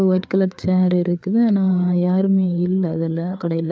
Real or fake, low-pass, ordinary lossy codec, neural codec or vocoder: fake; none; none; codec, 16 kHz, 4 kbps, FreqCodec, larger model